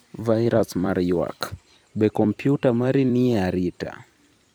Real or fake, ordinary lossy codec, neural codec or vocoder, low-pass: fake; none; vocoder, 44.1 kHz, 128 mel bands every 256 samples, BigVGAN v2; none